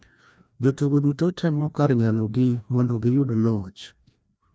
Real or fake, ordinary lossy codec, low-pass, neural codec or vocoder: fake; none; none; codec, 16 kHz, 1 kbps, FreqCodec, larger model